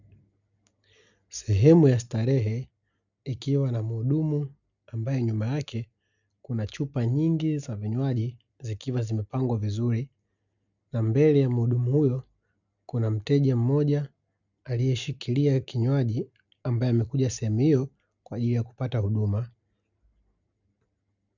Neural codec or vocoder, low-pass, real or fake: none; 7.2 kHz; real